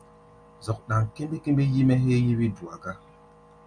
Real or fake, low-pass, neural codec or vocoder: real; 9.9 kHz; none